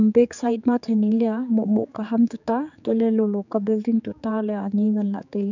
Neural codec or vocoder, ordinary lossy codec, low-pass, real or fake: codec, 16 kHz, 4 kbps, X-Codec, HuBERT features, trained on general audio; none; 7.2 kHz; fake